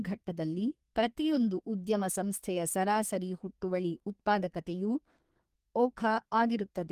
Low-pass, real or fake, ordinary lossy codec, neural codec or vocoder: 14.4 kHz; fake; Opus, 24 kbps; codec, 32 kHz, 1.9 kbps, SNAC